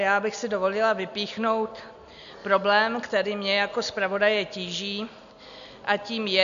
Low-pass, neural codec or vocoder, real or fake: 7.2 kHz; none; real